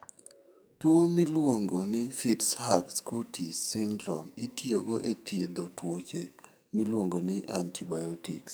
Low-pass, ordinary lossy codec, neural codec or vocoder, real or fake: none; none; codec, 44.1 kHz, 2.6 kbps, SNAC; fake